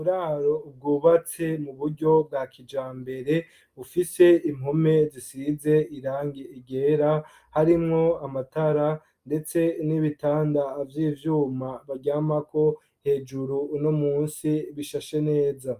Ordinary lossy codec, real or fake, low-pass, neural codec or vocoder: Opus, 32 kbps; real; 14.4 kHz; none